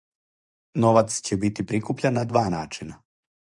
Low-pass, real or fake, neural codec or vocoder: 10.8 kHz; fake; vocoder, 24 kHz, 100 mel bands, Vocos